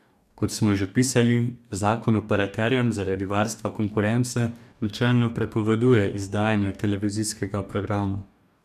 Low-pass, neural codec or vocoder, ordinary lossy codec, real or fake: 14.4 kHz; codec, 44.1 kHz, 2.6 kbps, DAC; none; fake